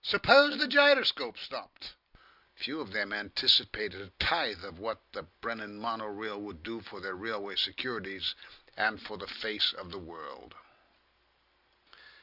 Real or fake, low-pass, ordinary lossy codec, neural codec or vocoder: real; 5.4 kHz; Opus, 64 kbps; none